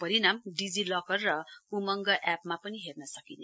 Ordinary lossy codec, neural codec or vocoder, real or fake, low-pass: none; none; real; none